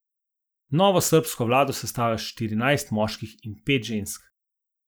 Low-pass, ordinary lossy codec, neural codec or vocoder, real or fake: none; none; none; real